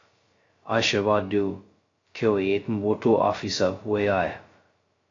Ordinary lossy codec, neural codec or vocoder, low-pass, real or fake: AAC, 32 kbps; codec, 16 kHz, 0.2 kbps, FocalCodec; 7.2 kHz; fake